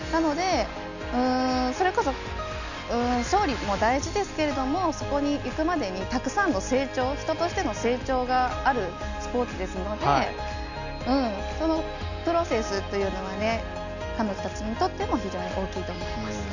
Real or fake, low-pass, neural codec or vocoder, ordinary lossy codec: real; 7.2 kHz; none; none